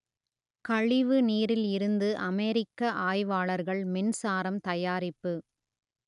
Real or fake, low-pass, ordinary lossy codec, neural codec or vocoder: real; 10.8 kHz; none; none